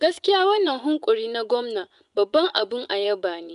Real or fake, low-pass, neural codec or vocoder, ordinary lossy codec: fake; 10.8 kHz; vocoder, 24 kHz, 100 mel bands, Vocos; none